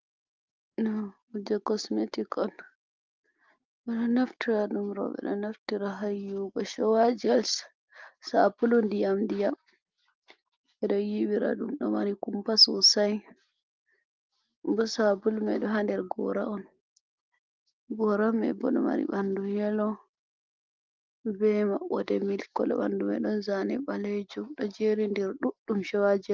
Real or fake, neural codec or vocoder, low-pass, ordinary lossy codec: real; none; 7.2 kHz; Opus, 24 kbps